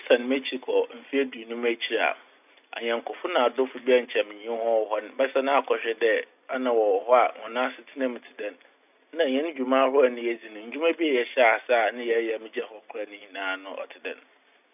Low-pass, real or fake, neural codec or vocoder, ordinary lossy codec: 3.6 kHz; real; none; none